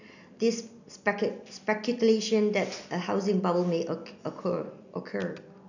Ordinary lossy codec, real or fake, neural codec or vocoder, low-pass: none; real; none; 7.2 kHz